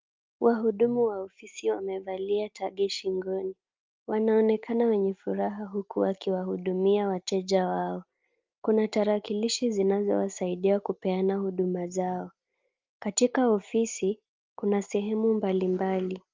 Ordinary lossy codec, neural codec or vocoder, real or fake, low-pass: Opus, 24 kbps; none; real; 7.2 kHz